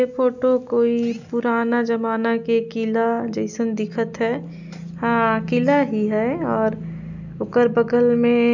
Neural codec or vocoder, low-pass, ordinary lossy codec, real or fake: none; 7.2 kHz; none; real